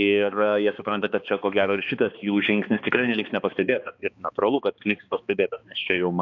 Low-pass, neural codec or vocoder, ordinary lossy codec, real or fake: 7.2 kHz; codec, 16 kHz, 2 kbps, X-Codec, HuBERT features, trained on balanced general audio; AAC, 48 kbps; fake